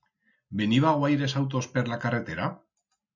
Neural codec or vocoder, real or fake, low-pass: none; real; 7.2 kHz